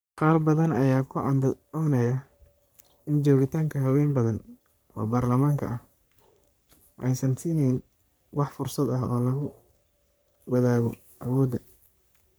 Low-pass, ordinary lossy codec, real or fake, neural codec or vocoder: none; none; fake; codec, 44.1 kHz, 3.4 kbps, Pupu-Codec